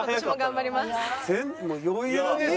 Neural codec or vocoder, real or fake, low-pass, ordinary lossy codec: none; real; none; none